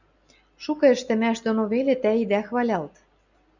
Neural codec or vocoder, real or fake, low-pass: none; real; 7.2 kHz